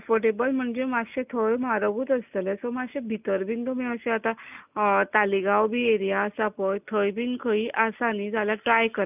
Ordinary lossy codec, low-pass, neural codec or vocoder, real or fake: none; 3.6 kHz; none; real